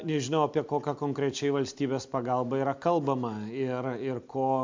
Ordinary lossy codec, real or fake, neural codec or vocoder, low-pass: MP3, 64 kbps; real; none; 7.2 kHz